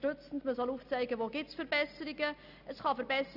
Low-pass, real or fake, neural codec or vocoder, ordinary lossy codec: 5.4 kHz; real; none; none